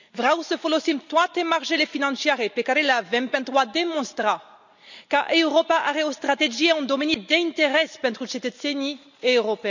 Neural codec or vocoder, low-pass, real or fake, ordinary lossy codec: none; 7.2 kHz; real; none